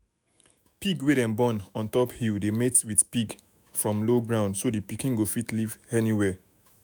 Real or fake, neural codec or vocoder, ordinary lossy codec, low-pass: fake; autoencoder, 48 kHz, 128 numbers a frame, DAC-VAE, trained on Japanese speech; none; none